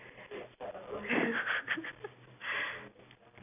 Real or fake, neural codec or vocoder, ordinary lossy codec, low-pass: real; none; none; 3.6 kHz